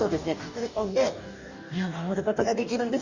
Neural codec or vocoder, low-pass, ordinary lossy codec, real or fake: codec, 44.1 kHz, 2.6 kbps, DAC; 7.2 kHz; Opus, 64 kbps; fake